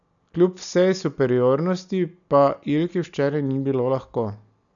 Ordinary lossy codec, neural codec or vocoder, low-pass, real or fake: none; none; 7.2 kHz; real